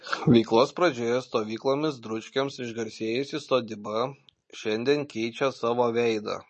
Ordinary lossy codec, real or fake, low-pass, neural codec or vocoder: MP3, 32 kbps; real; 10.8 kHz; none